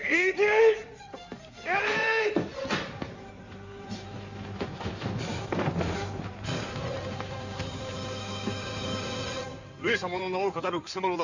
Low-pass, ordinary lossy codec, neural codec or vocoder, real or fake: 7.2 kHz; Opus, 64 kbps; codec, 16 kHz in and 24 kHz out, 2.2 kbps, FireRedTTS-2 codec; fake